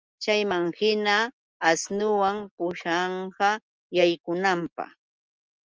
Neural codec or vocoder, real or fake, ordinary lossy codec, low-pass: none; real; Opus, 24 kbps; 7.2 kHz